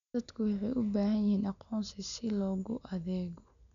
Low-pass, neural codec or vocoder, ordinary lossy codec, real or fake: 7.2 kHz; none; none; real